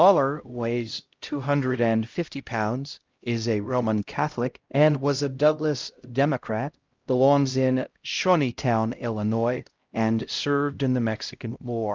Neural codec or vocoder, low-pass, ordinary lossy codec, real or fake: codec, 16 kHz, 0.5 kbps, X-Codec, HuBERT features, trained on LibriSpeech; 7.2 kHz; Opus, 32 kbps; fake